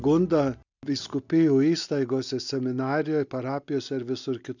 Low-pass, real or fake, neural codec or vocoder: 7.2 kHz; real; none